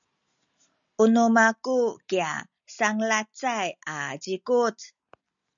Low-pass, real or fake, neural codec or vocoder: 7.2 kHz; real; none